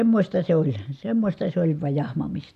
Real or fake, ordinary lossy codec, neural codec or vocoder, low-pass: real; none; none; 14.4 kHz